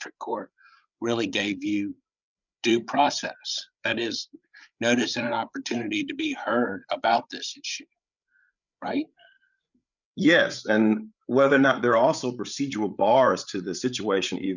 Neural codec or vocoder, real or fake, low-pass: codec, 16 kHz, 8 kbps, FreqCodec, larger model; fake; 7.2 kHz